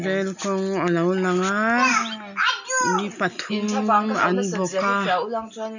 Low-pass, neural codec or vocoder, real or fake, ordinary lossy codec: 7.2 kHz; none; real; none